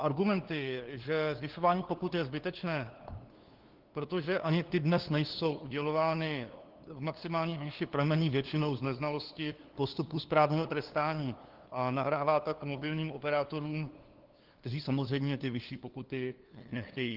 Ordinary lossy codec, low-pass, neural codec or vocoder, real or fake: Opus, 16 kbps; 5.4 kHz; codec, 16 kHz, 2 kbps, FunCodec, trained on LibriTTS, 25 frames a second; fake